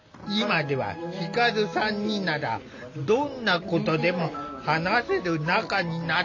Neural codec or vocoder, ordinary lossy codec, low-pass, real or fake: none; AAC, 48 kbps; 7.2 kHz; real